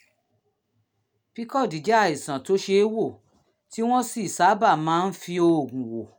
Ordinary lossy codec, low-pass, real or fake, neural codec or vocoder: none; none; real; none